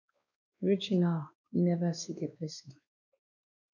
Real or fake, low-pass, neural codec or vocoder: fake; 7.2 kHz; codec, 16 kHz, 2 kbps, X-Codec, WavLM features, trained on Multilingual LibriSpeech